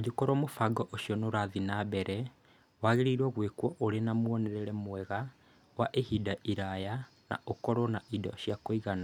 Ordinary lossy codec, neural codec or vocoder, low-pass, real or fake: none; none; 19.8 kHz; real